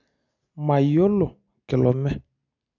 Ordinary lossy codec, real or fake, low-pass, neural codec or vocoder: none; real; 7.2 kHz; none